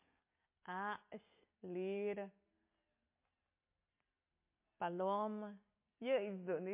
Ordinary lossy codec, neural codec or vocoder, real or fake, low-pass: MP3, 24 kbps; none; real; 3.6 kHz